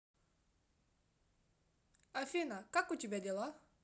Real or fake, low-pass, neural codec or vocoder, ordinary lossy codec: real; none; none; none